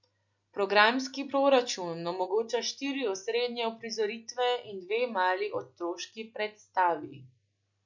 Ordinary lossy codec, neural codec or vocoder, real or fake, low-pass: none; none; real; 7.2 kHz